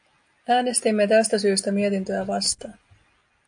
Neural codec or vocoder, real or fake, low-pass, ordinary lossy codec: none; real; 9.9 kHz; AAC, 64 kbps